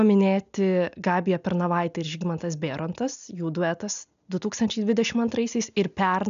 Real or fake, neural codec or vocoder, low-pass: real; none; 7.2 kHz